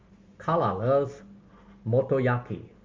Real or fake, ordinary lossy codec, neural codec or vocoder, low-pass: real; Opus, 32 kbps; none; 7.2 kHz